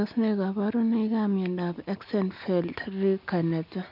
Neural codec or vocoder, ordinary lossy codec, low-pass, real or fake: none; none; 5.4 kHz; real